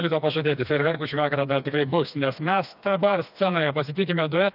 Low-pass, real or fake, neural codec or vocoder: 5.4 kHz; fake; codec, 16 kHz, 2 kbps, FreqCodec, smaller model